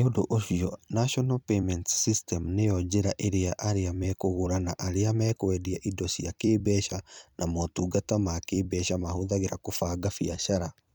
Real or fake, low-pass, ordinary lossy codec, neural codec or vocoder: fake; none; none; vocoder, 44.1 kHz, 128 mel bands every 256 samples, BigVGAN v2